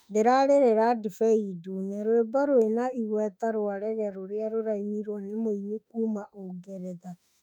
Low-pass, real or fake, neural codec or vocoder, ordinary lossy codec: 19.8 kHz; fake; autoencoder, 48 kHz, 32 numbers a frame, DAC-VAE, trained on Japanese speech; none